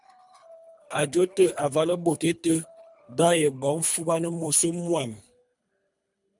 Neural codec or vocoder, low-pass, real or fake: codec, 24 kHz, 3 kbps, HILCodec; 10.8 kHz; fake